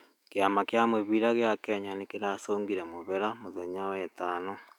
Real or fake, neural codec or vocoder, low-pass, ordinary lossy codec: fake; autoencoder, 48 kHz, 128 numbers a frame, DAC-VAE, trained on Japanese speech; 19.8 kHz; none